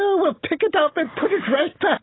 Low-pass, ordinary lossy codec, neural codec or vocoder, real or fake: 7.2 kHz; AAC, 16 kbps; none; real